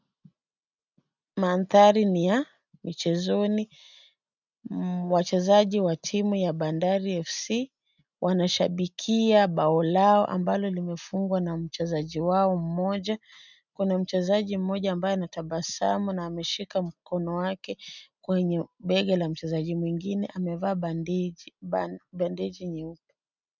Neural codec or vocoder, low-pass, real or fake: none; 7.2 kHz; real